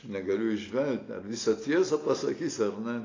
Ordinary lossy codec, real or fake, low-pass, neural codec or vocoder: AAC, 32 kbps; real; 7.2 kHz; none